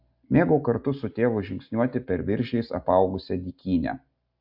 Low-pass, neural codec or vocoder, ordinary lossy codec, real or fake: 5.4 kHz; none; AAC, 48 kbps; real